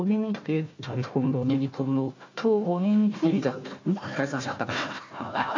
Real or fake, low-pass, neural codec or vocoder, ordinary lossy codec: fake; 7.2 kHz; codec, 16 kHz, 1 kbps, FunCodec, trained on Chinese and English, 50 frames a second; MP3, 48 kbps